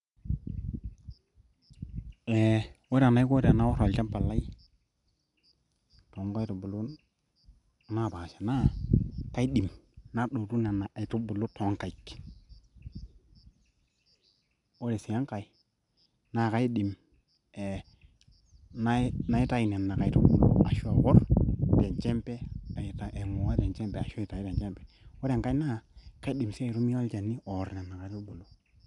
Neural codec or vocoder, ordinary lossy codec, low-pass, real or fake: none; none; 10.8 kHz; real